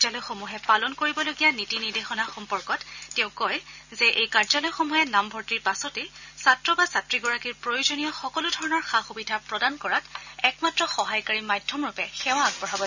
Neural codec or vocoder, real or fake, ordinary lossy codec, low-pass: none; real; none; 7.2 kHz